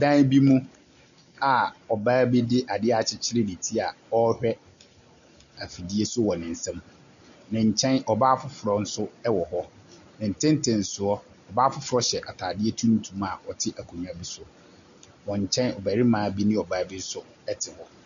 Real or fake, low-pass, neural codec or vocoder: real; 7.2 kHz; none